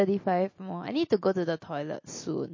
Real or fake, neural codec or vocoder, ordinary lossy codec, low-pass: real; none; MP3, 32 kbps; 7.2 kHz